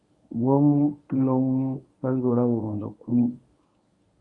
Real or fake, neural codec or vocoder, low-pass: fake; codec, 24 kHz, 0.9 kbps, WavTokenizer, medium speech release version 1; 10.8 kHz